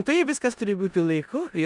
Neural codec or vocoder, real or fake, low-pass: codec, 16 kHz in and 24 kHz out, 0.9 kbps, LongCat-Audio-Codec, four codebook decoder; fake; 10.8 kHz